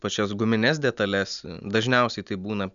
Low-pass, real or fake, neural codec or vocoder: 7.2 kHz; real; none